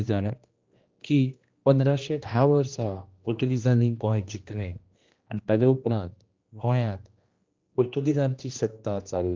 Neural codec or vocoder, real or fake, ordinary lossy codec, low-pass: codec, 16 kHz, 1 kbps, X-Codec, HuBERT features, trained on general audio; fake; Opus, 24 kbps; 7.2 kHz